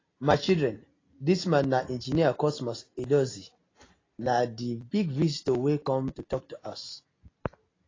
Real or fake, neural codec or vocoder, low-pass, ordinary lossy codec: real; none; 7.2 kHz; AAC, 32 kbps